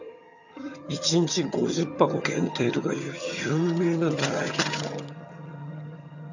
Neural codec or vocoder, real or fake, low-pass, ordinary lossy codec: vocoder, 22.05 kHz, 80 mel bands, HiFi-GAN; fake; 7.2 kHz; none